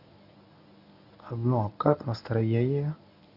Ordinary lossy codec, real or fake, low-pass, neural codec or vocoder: none; fake; 5.4 kHz; codec, 24 kHz, 0.9 kbps, WavTokenizer, medium speech release version 1